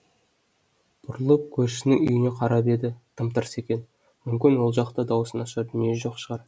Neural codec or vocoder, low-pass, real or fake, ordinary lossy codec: none; none; real; none